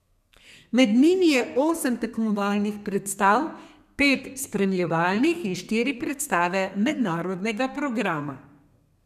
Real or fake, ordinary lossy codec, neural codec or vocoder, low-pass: fake; none; codec, 32 kHz, 1.9 kbps, SNAC; 14.4 kHz